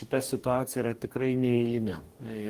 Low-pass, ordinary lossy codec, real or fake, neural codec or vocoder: 14.4 kHz; Opus, 24 kbps; fake; codec, 44.1 kHz, 2.6 kbps, DAC